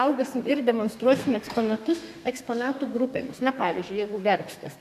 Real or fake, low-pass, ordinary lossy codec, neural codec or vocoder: fake; 14.4 kHz; AAC, 96 kbps; codec, 32 kHz, 1.9 kbps, SNAC